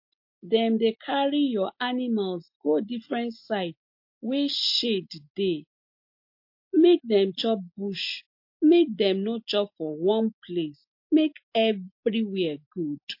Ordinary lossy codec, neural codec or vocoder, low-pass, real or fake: MP3, 32 kbps; none; 5.4 kHz; real